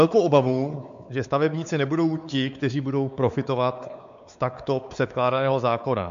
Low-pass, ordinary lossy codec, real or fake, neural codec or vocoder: 7.2 kHz; MP3, 64 kbps; fake; codec, 16 kHz, 4 kbps, X-Codec, WavLM features, trained on Multilingual LibriSpeech